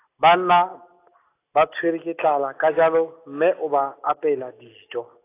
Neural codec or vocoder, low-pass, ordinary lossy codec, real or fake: none; 3.6 kHz; AAC, 24 kbps; real